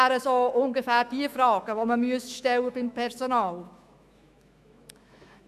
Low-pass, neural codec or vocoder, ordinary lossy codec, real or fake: 14.4 kHz; autoencoder, 48 kHz, 128 numbers a frame, DAC-VAE, trained on Japanese speech; none; fake